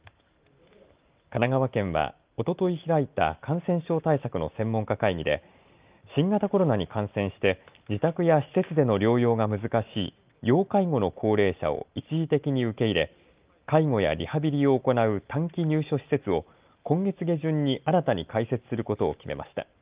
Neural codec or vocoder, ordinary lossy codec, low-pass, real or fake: none; Opus, 32 kbps; 3.6 kHz; real